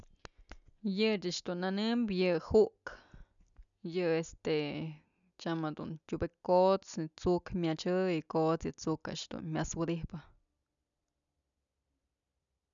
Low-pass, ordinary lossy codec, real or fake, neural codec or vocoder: 7.2 kHz; none; real; none